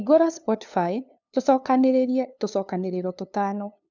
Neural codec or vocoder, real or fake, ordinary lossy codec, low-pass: codec, 16 kHz, 2 kbps, FunCodec, trained on LibriTTS, 25 frames a second; fake; none; 7.2 kHz